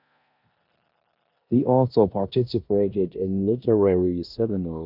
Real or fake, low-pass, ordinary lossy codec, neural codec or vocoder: fake; 5.4 kHz; MP3, 48 kbps; codec, 16 kHz in and 24 kHz out, 0.9 kbps, LongCat-Audio-Codec, four codebook decoder